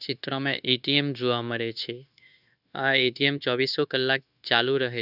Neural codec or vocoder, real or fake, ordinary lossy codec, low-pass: codec, 16 kHz, 0.9 kbps, LongCat-Audio-Codec; fake; none; 5.4 kHz